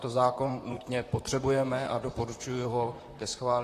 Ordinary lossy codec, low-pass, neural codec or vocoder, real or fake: AAC, 48 kbps; 14.4 kHz; vocoder, 44.1 kHz, 128 mel bands, Pupu-Vocoder; fake